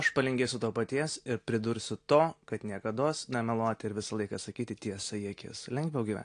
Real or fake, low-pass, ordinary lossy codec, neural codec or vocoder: real; 9.9 kHz; AAC, 48 kbps; none